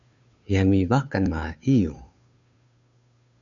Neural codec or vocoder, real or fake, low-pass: codec, 16 kHz, 2 kbps, FunCodec, trained on Chinese and English, 25 frames a second; fake; 7.2 kHz